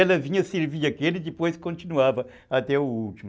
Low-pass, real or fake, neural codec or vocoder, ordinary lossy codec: none; real; none; none